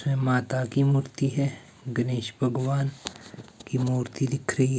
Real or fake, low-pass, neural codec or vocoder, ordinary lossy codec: real; none; none; none